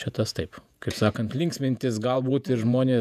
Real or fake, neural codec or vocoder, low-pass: fake; vocoder, 48 kHz, 128 mel bands, Vocos; 14.4 kHz